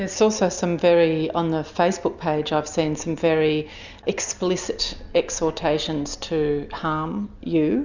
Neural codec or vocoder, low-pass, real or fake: none; 7.2 kHz; real